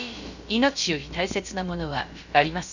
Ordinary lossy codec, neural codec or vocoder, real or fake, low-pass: none; codec, 16 kHz, about 1 kbps, DyCAST, with the encoder's durations; fake; 7.2 kHz